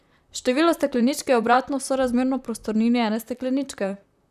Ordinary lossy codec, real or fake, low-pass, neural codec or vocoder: none; fake; 14.4 kHz; vocoder, 44.1 kHz, 128 mel bands, Pupu-Vocoder